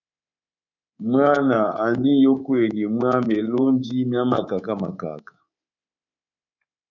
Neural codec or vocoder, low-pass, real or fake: codec, 24 kHz, 3.1 kbps, DualCodec; 7.2 kHz; fake